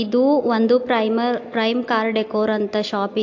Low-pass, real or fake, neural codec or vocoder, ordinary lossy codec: 7.2 kHz; real; none; AAC, 48 kbps